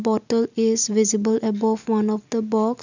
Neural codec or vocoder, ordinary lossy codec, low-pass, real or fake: none; none; 7.2 kHz; real